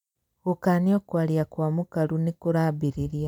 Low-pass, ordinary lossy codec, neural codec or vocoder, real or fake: 19.8 kHz; none; vocoder, 44.1 kHz, 128 mel bands every 256 samples, BigVGAN v2; fake